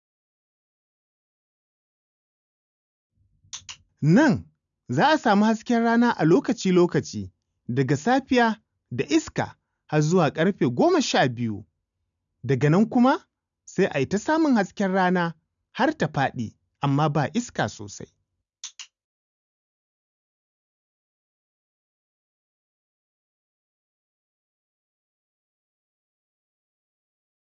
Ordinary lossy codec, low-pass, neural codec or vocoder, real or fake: none; 7.2 kHz; none; real